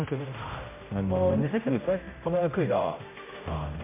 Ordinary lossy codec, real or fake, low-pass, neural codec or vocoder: MP3, 32 kbps; fake; 3.6 kHz; codec, 16 kHz, 0.5 kbps, X-Codec, HuBERT features, trained on general audio